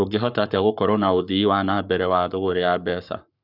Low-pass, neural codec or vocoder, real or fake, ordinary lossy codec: 5.4 kHz; codec, 44.1 kHz, 7.8 kbps, Pupu-Codec; fake; none